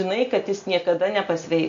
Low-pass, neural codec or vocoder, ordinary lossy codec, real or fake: 7.2 kHz; none; MP3, 48 kbps; real